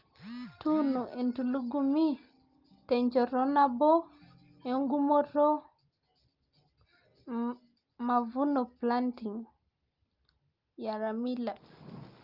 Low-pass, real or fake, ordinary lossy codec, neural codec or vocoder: 5.4 kHz; real; Opus, 24 kbps; none